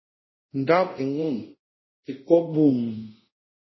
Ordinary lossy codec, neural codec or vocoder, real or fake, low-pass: MP3, 24 kbps; codec, 24 kHz, 0.5 kbps, DualCodec; fake; 7.2 kHz